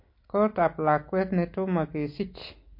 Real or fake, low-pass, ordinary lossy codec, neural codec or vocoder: real; 5.4 kHz; MP3, 32 kbps; none